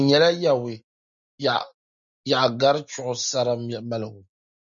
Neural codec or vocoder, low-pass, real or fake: none; 7.2 kHz; real